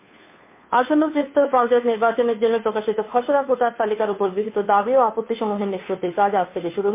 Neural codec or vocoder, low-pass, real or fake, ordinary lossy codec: codec, 16 kHz, 2 kbps, FunCodec, trained on Chinese and English, 25 frames a second; 3.6 kHz; fake; MP3, 24 kbps